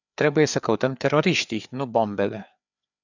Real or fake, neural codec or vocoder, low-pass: fake; codec, 16 kHz, 4 kbps, FreqCodec, larger model; 7.2 kHz